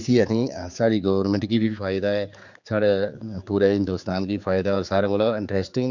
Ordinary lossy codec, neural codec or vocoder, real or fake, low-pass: none; codec, 16 kHz, 4 kbps, X-Codec, HuBERT features, trained on general audio; fake; 7.2 kHz